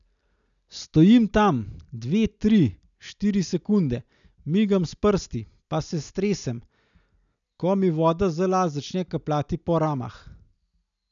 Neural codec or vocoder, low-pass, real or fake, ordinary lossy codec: none; 7.2 kHz; real; none